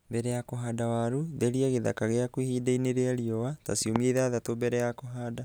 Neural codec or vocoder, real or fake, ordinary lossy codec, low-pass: none; real; none; none